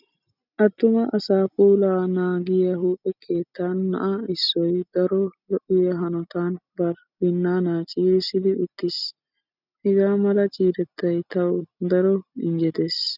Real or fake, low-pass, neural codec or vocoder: real; 5.4 kHz; none